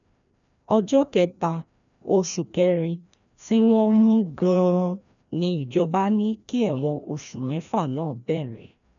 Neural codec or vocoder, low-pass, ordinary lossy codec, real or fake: codec, 16 kHz, 1 kbps, FreqCodec, larger model; 7.2 kHz; none; fake